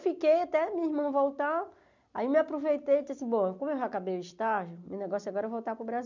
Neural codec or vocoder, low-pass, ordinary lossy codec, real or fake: none; 7.2 kHz; none; real